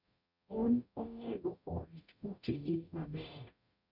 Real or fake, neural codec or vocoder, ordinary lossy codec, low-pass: fake; codec, 44.1 kHz, 0.9 kbps, DAC; none; 5.4 kHz